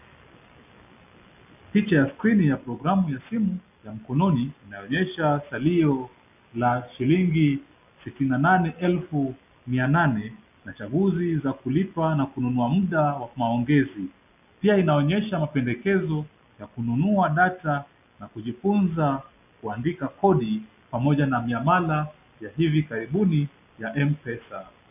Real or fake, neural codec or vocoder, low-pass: real; none; 3.6 kHz